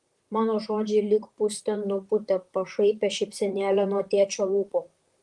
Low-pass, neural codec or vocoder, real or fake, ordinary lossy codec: 10.8 kHz; vocoder, 44.1 kHz, 128 mel bands, Pupu-Vocoder; fake; Opus, 24 kbps